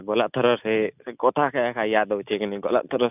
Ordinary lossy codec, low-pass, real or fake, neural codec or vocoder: none; 3.6 kHz; real; none